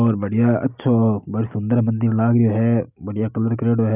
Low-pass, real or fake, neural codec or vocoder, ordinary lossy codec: 3.6 kHz; real; none; none